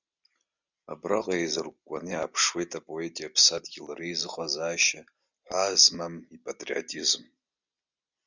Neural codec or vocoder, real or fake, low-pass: none; real; 7.2 kHz